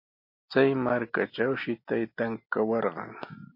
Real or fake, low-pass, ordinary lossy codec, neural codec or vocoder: real; 5.4 kHz; MP3, 32 kbps; none